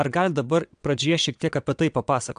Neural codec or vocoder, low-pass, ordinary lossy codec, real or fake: vocoder, 22.05 kHz, 80 mel bands, WaveNeXt; 9.9 kHz; AAC, 96 kbps; fake